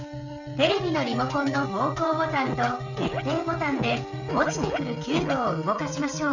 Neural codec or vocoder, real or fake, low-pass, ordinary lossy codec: codec, 16 kHz, 16 kbps, FreqCodec, smaller model; fake; 7.2 kHz; none